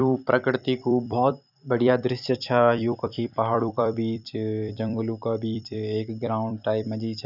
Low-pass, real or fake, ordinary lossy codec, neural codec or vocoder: 5.4 kHz; fake; none; vocoder, 44.1 kHz, 128 mel bands every 256 samples, BigVGAN v2